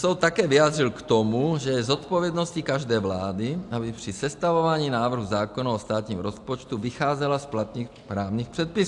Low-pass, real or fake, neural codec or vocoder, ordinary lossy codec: 10.8 kHz; real; none; AAC, 64 kbps